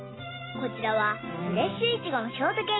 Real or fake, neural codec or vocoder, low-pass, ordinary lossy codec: real; none; 7.2 kHz; AAC, 16 kbps